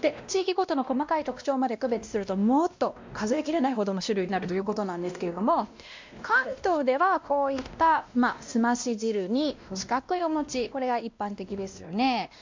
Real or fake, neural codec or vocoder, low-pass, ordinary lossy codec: fake; codec, 16 kHz, 1 kbps, X-Codec, WavLM features, trained on Multilingual LibriSpeech; 7.2 kHz; MP3, 64 kbps